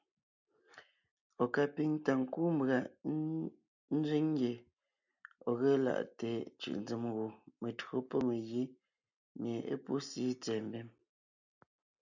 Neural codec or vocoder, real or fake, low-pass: none; real; 7.2 kHz